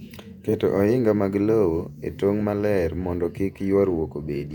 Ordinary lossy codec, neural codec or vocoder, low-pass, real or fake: MP3, 96 kbps; vocoder, 48 kHz, 128 mel bands, Vocos; 19.8 kHz; fake